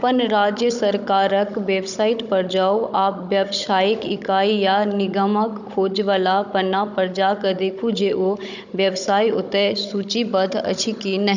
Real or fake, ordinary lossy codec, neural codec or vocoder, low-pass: fake; none; codec, 16 kHz, 16 kbps, FunCodec, trained on Chinese and English, 50 frames a second; 7.2 kHz